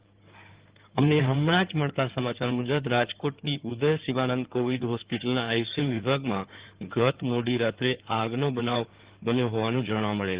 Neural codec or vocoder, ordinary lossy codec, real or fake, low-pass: codec, 16 kHz in and 24 kHz out, 2.2 kbps, FireRedTTS-2 codec; Opus, 16 kbps; fake; 3.6 kHz